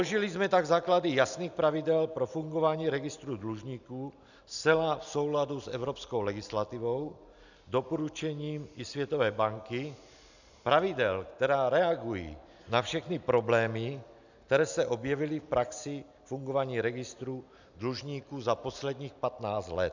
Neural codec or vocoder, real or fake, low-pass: none; real; 7.2 kHz